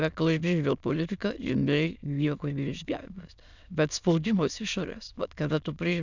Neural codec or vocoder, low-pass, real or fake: autoencoder, 22.05 kHz, a latent of 192 numbers a frame, VITS, trained on many speakers; 7.2 kHz; fake